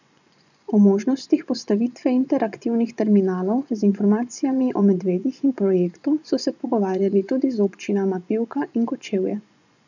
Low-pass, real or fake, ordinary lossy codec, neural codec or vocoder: 7.2 kHz; fake; none; vocoder, 24 kHz, 100 mel bands, Vocos